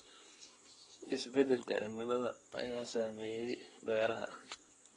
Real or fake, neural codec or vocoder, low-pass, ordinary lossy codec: fake; codec, 24 kHz, 1 kbps, SNAC; 10.8 kHz; AAC, 32 kbps